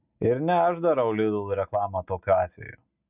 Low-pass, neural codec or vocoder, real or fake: 3.6 kHz; none; real